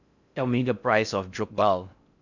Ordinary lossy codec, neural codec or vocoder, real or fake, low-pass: none; codec, 16 kHz in and 24 kHz out, 0.6 kbps, FocalCodec, streaming, 4096 codes; fake; 7.2 kHz